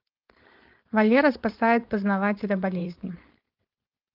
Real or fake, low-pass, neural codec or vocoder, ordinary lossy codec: fake; 5.4 kHz; codec, 16 kHz, 4.8 kbps, FACodec; Opus, 32 kbps